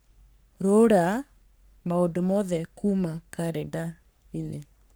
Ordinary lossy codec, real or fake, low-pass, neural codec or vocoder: none; fake; none; codec, 44.1 kHz, 3.4 kbps, Pupu-Codec